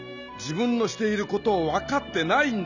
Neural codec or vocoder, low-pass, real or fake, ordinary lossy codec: none; 7.2 kHz; real; none